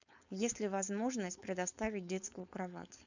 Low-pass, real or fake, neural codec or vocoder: 7.2 kHz; fake; codec, 16 kHz, 4.8 kbps, FACodec